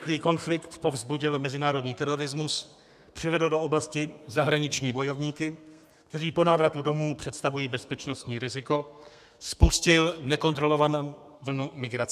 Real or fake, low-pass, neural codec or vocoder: fake; 14.4 kHz; codec, 32 kHz, 1.9 kbps, SNAC